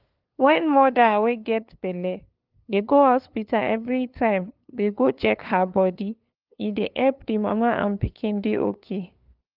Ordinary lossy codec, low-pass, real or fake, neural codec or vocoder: Opus, 32 kbps; 5.4 kHz; fake; codec, 16 kHz, 2 kbps, FunCodec, trained on LibriTTS, 25 frames a second